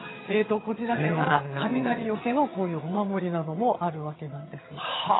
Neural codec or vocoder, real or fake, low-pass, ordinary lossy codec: vocoder, 22.05 kHz, 80 mel bands, HiFi-GAN; fake; 7.2 kHz; AAC, 16 kbps